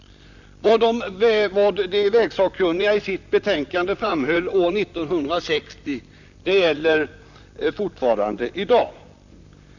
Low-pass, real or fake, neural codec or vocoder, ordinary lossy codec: 7.2 kHz; fake; vocoder, 44.1 kHz, 128 mel bands, Pupu-Vocoder; none